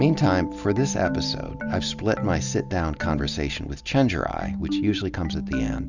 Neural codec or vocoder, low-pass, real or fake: none; 7.2 kHz; real